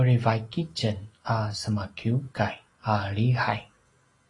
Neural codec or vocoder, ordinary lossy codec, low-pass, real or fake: none; AAC, 32 kbps; 10.8 kHz; real